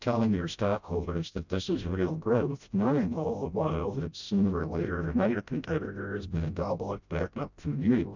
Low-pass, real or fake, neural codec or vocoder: 7.2 kHz; fake; codec, 16 kHz, 0.5 kbps, FreqCodec, smaller model